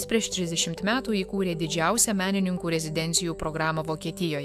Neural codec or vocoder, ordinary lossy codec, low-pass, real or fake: autoencoder, 48 kHz, 128 numbers a frame, DAC-VAE, trained on Japanese speech; AAC, 96 kbps; 14.4 kHz; fake